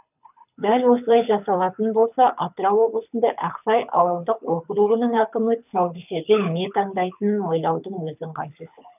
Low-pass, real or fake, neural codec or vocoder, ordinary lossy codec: 3.6 kHz; fake; codec, 24 kHz, 6 kbps, HILCodec; none